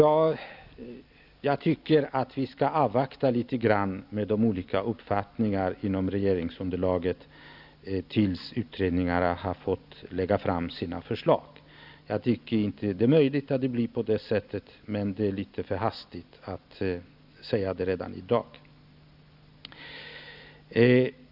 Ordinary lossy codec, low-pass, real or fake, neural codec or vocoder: none; 5.4 kHz; real; none